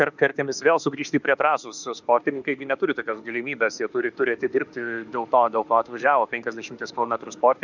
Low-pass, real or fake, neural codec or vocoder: 7.2 kHz; fake; autoencoder, 48 kHz, 32 numbers a frame, DAC-VAE, trained on Japanese speech